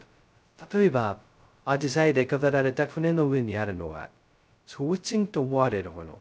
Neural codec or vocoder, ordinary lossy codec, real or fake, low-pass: codec, 16 kHz, 0.2 kbps, FocalCodec; none; fake; none